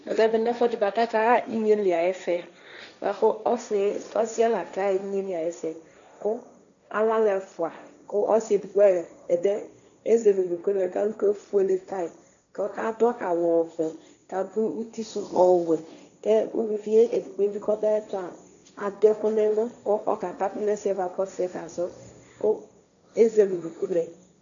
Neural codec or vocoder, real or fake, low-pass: codec, 16 kHz, 1.1 kbps, Voila-Tokenizer; fake; 7.2 kHz